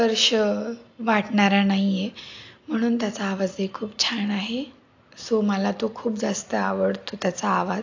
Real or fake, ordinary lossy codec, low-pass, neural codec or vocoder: real; AAC, 48 kbps; 7.2 kHz; none